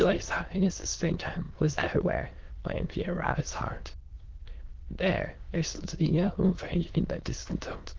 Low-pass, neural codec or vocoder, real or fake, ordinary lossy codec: 7.2 kHz; autoencoder, 22.05 kHz, a latent of 192 numbers a frame, VITS, trained on many speakers; fake; Opus, 16 kbps